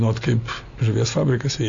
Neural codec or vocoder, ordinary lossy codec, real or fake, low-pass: none; AAC, 64 kbps; real; 7.2 kHz